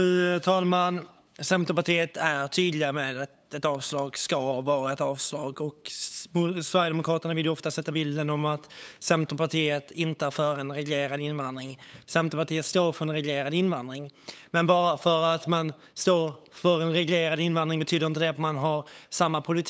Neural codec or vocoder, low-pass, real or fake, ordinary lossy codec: codec, 16 kHz, 8 kbps, FunCodec, trained on LibriTTS, 25 frames a second; none; fake; none